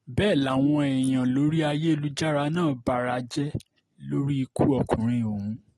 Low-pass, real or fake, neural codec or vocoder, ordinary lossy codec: 19.8 kHz; real; none; AAC, 32 kbps